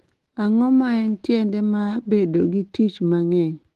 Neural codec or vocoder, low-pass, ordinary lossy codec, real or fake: autoencoder, 48 kHz, 128 numbers a frame, DAC-VAE, trained on Japanese speech; 14.4 kHz; Opus, 16 kbps; fake